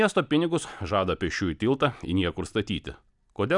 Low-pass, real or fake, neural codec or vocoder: 10.8 kHz; real; none